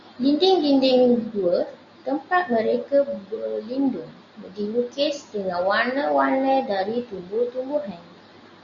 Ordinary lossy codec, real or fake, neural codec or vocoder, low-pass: Opus, 64 kbps; real; none; 7.2 kHz